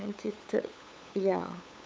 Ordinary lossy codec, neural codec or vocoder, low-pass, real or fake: none; codec, 16 kHz, 8 kbps, FunCodec, trained on LibriTTS, 25 frames a second; none; fake